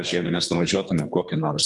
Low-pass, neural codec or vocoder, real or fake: 10.8 kHz; codec, 24 kHz, 3 kbps, HILCodec; fake